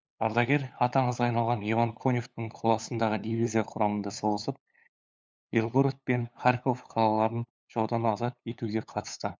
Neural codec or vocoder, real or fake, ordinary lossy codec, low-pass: codec, 16 kHz, 8 kbps, FunCodec, trained on LibriTTS, 25 frames a second; fake; none; none